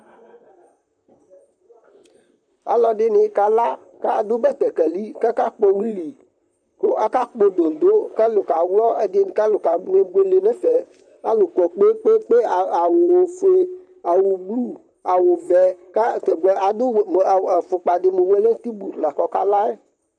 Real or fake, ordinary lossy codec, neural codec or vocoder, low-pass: fake; MP3, 96 kbps; vocoder, 44.1 kHz, 128 mel bands, Pupu-Vocoder; 9.9 kHz